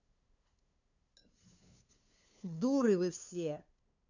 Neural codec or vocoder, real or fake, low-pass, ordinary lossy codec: codec, 16 kHz, 2 kbps, FunCodec, trained on LibriTTS, 25 frames a second; fake; 7.2 kHz; none